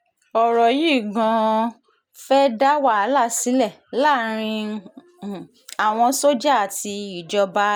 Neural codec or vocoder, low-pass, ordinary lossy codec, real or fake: none; none; none; real